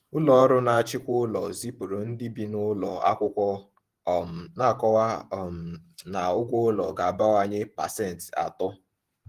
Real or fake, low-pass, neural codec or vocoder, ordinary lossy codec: fake; 14.4 kHz; vocoder, 48 kHz, 128 mel bands, Vocos; Opus, 24 kbps